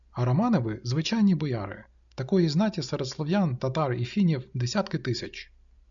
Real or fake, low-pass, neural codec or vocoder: real; 7.2 kHz; none